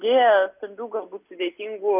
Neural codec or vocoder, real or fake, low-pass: none; real; 3.6 kHz